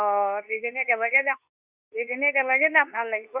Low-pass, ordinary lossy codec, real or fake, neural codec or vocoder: 3.6 kHz; Opus, 64 kbps; fake; codec, 24 kHz, 0.9 kbps, WavTokenizer, medium speech release version 2